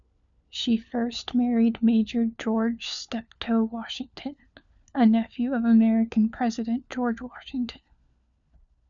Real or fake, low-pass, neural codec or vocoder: fake; 7.2 kHz; codec, 16 kHz, 4 kbps, FunCodec, trained on LibriTTS, 50 frames a second